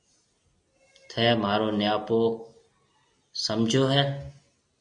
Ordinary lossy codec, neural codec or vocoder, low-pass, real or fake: MP3, 48 kbps; none; 9.9 kHz; real